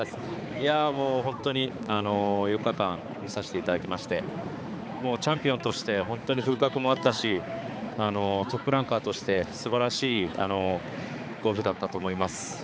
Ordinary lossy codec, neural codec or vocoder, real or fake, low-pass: none; codec, 16 kHz, 4 kbps, X-Codec, HuBERT features, trained on balanced general audio; fake; none